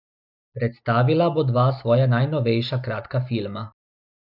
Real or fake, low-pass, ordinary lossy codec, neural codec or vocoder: real; 5.4 kHz; none; none